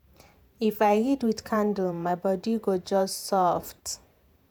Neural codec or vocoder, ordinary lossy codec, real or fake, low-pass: vocoder, 48 kHz, 128 mel bands, Vocos; none; fake; none